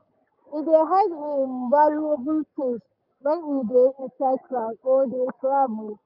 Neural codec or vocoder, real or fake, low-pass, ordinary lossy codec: codec, 44.1 kHz, 7.8 kbps, Pupu-Codec; fake; 5.4 kHz; none